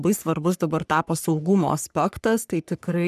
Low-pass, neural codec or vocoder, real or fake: 14.4 kHz; codec, 44.1 kHz, 3.4 kbps, Pupu-Codec; fake